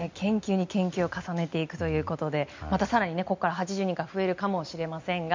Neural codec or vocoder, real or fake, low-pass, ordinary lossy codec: none; real; 7.2 kHz; MP3, 64 kbps